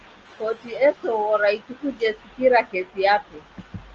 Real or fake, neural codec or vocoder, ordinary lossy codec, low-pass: real; none; Opus, 24 kbps; 7.2 kHz